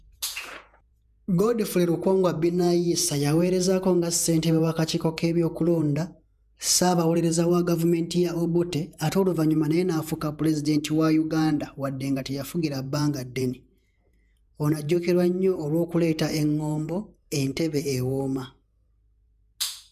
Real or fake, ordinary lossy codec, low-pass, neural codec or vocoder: fake; none; 14.4 kHz; vocoder, 48 kHz, 128 mel bands, Vocos